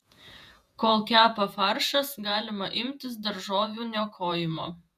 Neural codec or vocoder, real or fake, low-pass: vocoder, 48 kHz, 128 mel bands, Vocos; fake; 14.4 kHz